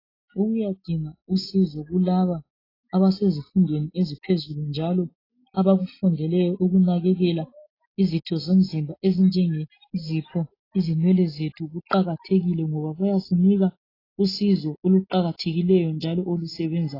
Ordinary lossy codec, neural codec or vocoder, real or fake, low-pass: AAC, 24 kbps; none; real; 5.4 kHz